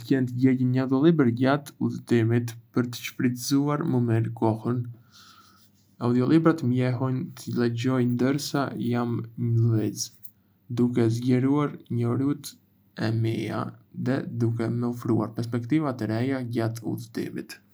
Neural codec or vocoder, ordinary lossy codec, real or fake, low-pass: none; none; real; none